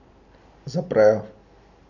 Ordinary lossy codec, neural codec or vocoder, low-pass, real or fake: none; none; 7.2 kHz; real